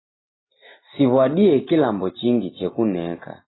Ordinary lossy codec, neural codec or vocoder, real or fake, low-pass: AAC, 16 kbps; none; real; 7.2 kHz